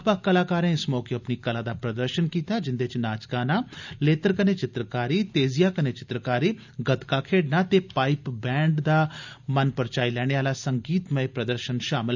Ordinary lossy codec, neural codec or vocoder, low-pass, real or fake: none; none; 7.2 kHz; real